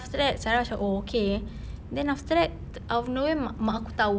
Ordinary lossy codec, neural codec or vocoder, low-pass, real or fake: none; none; none; real